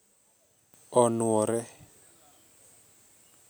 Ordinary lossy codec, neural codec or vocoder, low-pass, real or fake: none; none; none; real